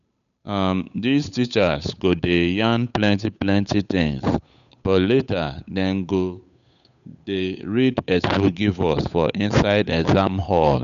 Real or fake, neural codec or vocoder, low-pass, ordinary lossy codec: fake; codec, 16 kHz, 8 kbps, FunCodec, trained on Chinese and English, 25 frames a second; 7.2 kHz; none